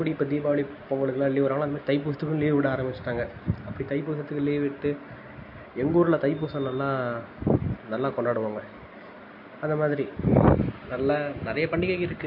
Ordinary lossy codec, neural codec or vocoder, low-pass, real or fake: MP3, 32 kbps; none; 5.4 kHz; real